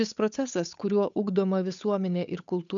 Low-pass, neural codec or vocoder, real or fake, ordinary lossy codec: 7.2 kHz; codec, 16 kHz, 8 kbps, FunCodec, trained on Chinese and English, 25 frames a second; fake; MP3, 96 kbps